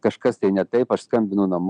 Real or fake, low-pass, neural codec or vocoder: real; 9.9 kHz; none